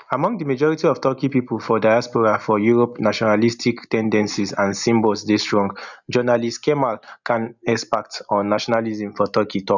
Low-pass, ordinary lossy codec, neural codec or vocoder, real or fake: 7.2 kHz; none; none; real